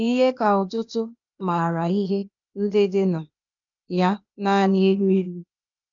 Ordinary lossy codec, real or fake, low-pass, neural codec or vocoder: none; fake; 7.2 kHz; codec, 16 kHz, 0.8 kbps, ZipCodec